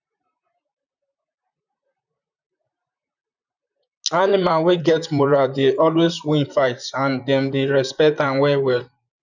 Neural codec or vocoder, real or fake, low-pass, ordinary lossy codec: vocoder, 44.1 kHz, 128 mel bands, Pupu-Vocoder; fake; 7.2 kHz; none